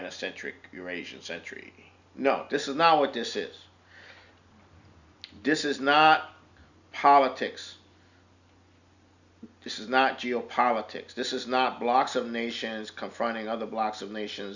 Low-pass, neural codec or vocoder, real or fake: 7.2 kHz; none; real